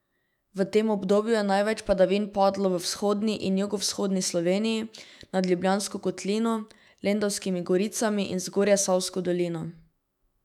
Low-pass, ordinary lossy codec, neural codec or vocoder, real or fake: 19.8 kHz; none; autoencoder, 48 kHz, 128 numbers a frame, DAC-VAE, trained on Japanese speech; fake